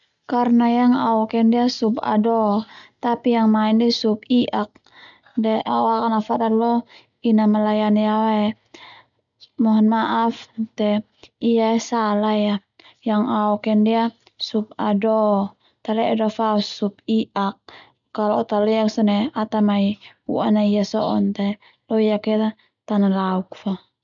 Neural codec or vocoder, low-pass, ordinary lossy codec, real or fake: none; 7.2 kHz; none; real